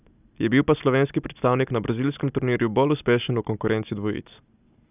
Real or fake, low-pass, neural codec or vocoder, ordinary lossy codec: real; 3.6 kHz; none; none